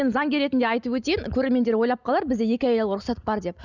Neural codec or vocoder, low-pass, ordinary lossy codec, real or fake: none; 7.2 kHz; none; real